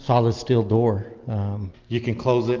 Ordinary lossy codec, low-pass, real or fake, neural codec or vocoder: Opus, 24 kbps; 7.2 kHz; real; none